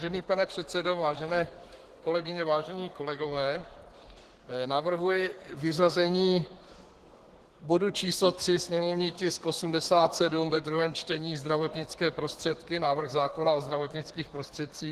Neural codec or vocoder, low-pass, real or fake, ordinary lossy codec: codec, 32 kHz, 1.9 kbps, SNAC; 14.4 kHz; fake; Opus, 16 kbps